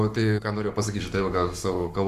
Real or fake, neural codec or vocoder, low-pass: fake; codec, 44.1 kHz, 7.8 kbps, Pupu-Codec; 14.4 kHz